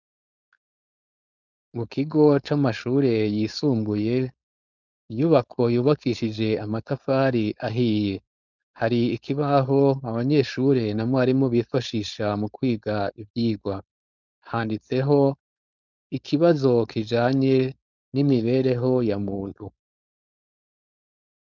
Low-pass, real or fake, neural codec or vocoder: 7.2 kHz; fake; codec, 16 kHz, 4.8 kbps, FACodec